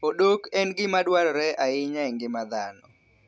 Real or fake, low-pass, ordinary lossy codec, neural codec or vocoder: real; none; none; none